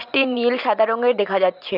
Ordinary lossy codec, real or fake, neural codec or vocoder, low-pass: none; fake; vocoder, 44.1 kHz, 128 mel bands every 512 samples, BigVGAN v2; 5.4 kHz